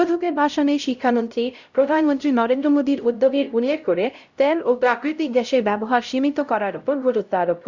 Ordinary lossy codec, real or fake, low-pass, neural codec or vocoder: Opus, 64 kbps; fake; 7.2 kHz; codec, 16 kHz, 0.5 kbps, X-Codec, HuBERT features, trained on LibriSpeech